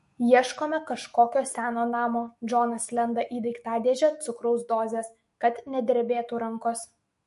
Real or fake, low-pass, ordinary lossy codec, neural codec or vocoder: fake; 14.4 kHz; MP3, 48 kbps; autoencoder, 48 kHz, 128 numbers a frame, DAC-VAE, trained on Japanese speech